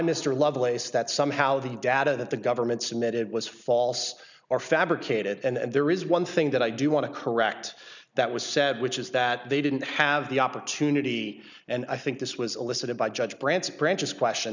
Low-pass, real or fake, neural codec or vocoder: 7.2 kHz; real; none